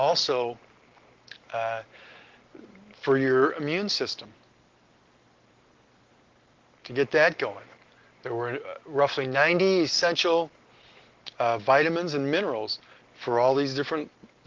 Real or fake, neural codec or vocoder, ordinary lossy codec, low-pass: real; none; Opus, 16 kbps; 7.2 kHz